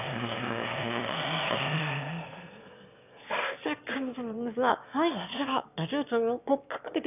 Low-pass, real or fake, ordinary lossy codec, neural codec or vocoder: 3.6 kHz; fake; none; autoencoder, 22.05 kHz, a latent of 192 numbers a frame, VITS, trained on one speaker